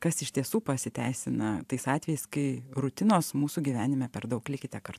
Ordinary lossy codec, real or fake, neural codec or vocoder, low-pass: AAC, 96 kbps; fake; vocoder, 44.1 kHz, 128 mel bands every 512 samples, BigVGAN v2; 14.4 kHz